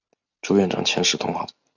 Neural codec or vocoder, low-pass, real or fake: none; 7.2 kHz; real